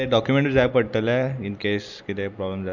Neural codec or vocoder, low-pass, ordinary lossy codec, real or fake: none; 7.2 kHz; none; real